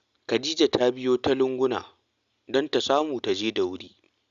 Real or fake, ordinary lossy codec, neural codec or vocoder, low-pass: real; Opus, 64 kbps; none; 7.2 kHz